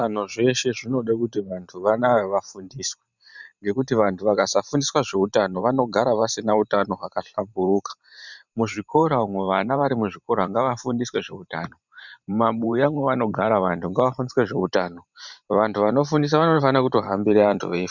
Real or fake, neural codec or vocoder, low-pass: fake; vocoder, 44.1 kHz, 128 mel bands every 512 samples, BigVGAN v2; 7.2 kHz